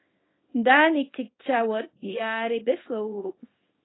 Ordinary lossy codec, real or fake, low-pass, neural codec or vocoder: AAC, 16 kbps; fake; 7.2 kHz; codec, 24 kHz, 0.9 kbps, WavTokenizer, small release